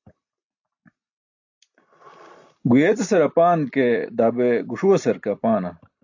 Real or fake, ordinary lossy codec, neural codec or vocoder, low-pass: real; AAC, 48 kbps; none; 7.2 kHz